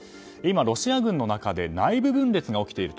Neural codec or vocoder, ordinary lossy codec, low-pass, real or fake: none; none; none; real